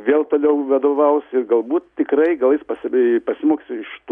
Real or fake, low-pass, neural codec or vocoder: real; 9.9 kHz; none